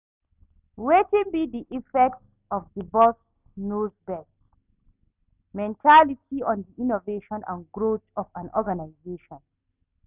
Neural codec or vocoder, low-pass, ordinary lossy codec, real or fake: none; 3.6 kHz; none; real